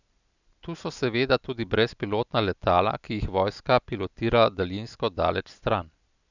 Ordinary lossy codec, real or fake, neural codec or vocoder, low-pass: none; real; none; 7.2 kHz